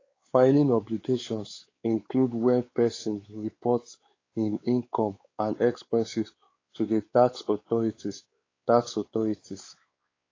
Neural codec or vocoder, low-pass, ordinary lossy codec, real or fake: codec, 16 kHz, 4 kbps, X-Codec, WavLM features, trained on Multilingual LibriSpeech; 7.2 kHz; AAC, 32 kbps; fake